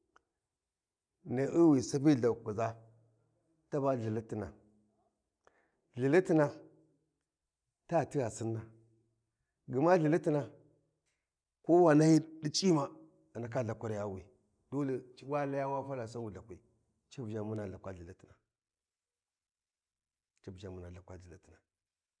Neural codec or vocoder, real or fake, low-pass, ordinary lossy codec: none; real; 9.9 kHz; none